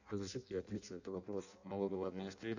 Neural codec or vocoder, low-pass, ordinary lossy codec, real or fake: codec, 16 kHz in and 24 kHz out, 0.6 kbps, FireRedTTS-2 codec; 7.2 kHz; none; fake